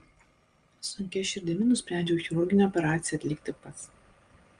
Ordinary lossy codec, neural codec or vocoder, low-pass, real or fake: Opus, 32 kbps; none; 9.9 kHz; real